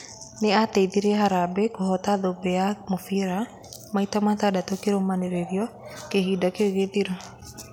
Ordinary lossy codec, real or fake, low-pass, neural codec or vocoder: none; real; 19.8 kHz; none